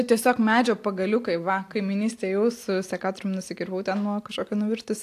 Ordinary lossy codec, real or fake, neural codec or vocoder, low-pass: AAC, 64 kbps; real; none; 14.4 kHz